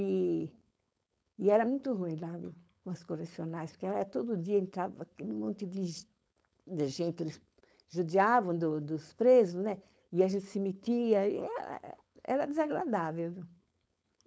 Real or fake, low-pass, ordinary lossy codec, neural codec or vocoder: fake; none; none; codec, 16 kHz, 4.8 kbps, FACodec